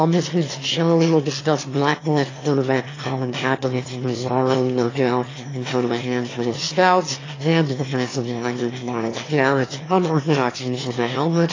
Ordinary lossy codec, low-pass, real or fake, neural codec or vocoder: AAC, 32 kbps; 7.2 kHz; fake; autoencoder, 22.05 kHz, a latent of 192 numbers a frame, VITS, trained on one speaker